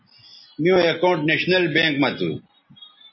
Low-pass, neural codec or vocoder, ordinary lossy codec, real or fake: 7.2 kHz; none; MP3, 24 kbps; real